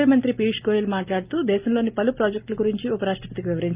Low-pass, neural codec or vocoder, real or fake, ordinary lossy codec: 3.6 kHz; none; real; Opus, 24 kbps